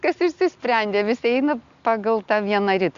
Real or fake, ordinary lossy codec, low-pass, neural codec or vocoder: real; Opus, 64 kbps; 7.2 kHz; none